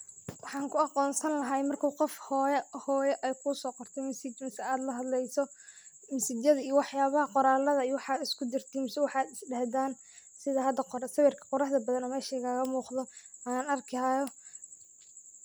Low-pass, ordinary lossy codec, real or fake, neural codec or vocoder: none; none; real; none